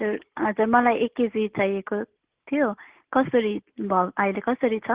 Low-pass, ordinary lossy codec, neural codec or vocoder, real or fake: 3.6 kHz; Opus, 16 kbps; none; real